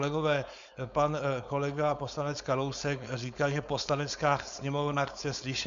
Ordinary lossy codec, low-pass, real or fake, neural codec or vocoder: MP3, 64 kbps; 7.2 kHz; fake; codec, 16 kHz, 4.8 kbps, FACodec